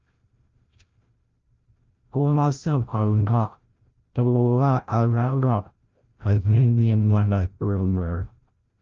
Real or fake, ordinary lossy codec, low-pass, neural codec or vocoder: fake; Opus, 24 kbps; 7.2 kHz; codec, 16 kHz, 0.5 kbps, FreqCodec, larger model